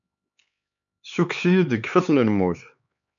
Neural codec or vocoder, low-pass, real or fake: codec, 16 kHz, 4 kbps, X-Codec, HuBERT features, trained on LibriSpeech; 7.2 kHz; fake